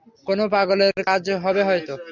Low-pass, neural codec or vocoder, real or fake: 7.2 kHz; none; real